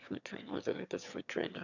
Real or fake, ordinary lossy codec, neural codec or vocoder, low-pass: fake; none; autoencoder, 22.05 kHz, a latent of 192 numbers a frame, VITS, trained on one speaker; 7.2 kHz